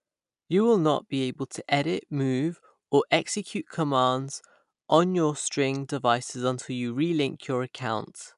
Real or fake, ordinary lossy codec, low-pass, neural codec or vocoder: real; none; 10.8 kHz; none